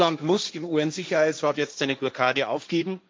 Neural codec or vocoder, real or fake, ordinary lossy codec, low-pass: codec, 16 kHz, 1.1 kbps, Voila-Tokenizer; fake; none; none